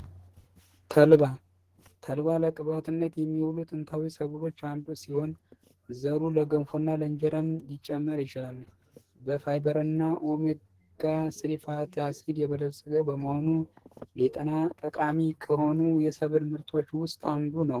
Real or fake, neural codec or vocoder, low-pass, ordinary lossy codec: fake; codec, 44.1 kHz, 2.6 kbps, SNAC; 14.4 kHz; Opus, 16 kbps